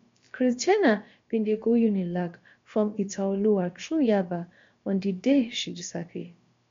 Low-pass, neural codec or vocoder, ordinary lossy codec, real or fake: 7.2 kHz; codec, 16 kHz, about 1 kbps, DyCAST, with the encoder's durations; MP3, 48 kbps; fake